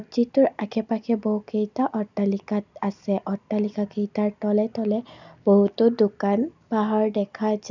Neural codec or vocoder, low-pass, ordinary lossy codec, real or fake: none; 7.2 kHz; none; real